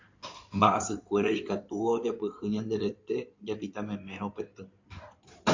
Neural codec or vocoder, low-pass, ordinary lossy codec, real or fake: codec, 16 kHz in and 24 kHz out, 2.2 kbps, FireRedTTS-2 codec; 7.2 kHz; MP3, 48 kbps; fake